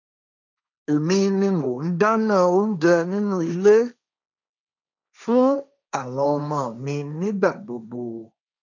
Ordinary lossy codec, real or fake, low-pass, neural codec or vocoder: none; fake; 7.2 kHz; codec, 16 kHz, 1.1 kbps, Voila-Tokenizer